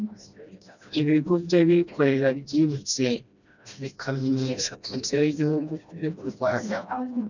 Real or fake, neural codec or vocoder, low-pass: fake; codec, 16 kHz, 1 kbps, FreqCodec, smaller model; 7.2 kHz